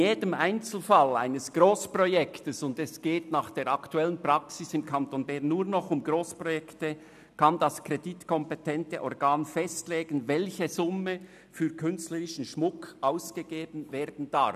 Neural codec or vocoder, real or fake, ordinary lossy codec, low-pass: none; real; none; 14.4 kHz